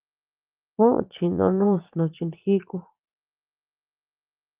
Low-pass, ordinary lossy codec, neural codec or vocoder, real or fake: 3.6 kHz; Opus, 32 kbps; vocoder, 22.05 kHz, 80 mel bands, Vocos; fake